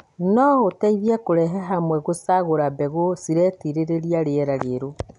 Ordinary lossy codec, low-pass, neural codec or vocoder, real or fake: none; 10.8 kHz; none; real